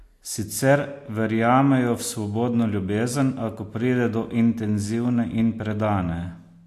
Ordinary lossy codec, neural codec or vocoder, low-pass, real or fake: AAC, 64 kbps; none; 14.4 kHz; real